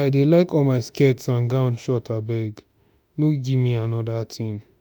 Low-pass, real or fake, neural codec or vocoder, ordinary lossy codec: none; fake; autoencoder, 48 kHz, 32 numbers a frame, DAC-VAE, trained on Japanese speech; none